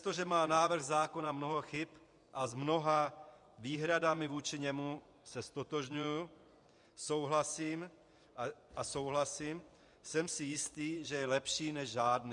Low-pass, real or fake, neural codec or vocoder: 10.8 kHz; fake; vocoder, 24 kHz, 100 mel bands, Vocos